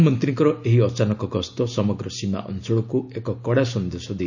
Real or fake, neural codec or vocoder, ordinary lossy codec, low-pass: real; none; none; 7.2 kHz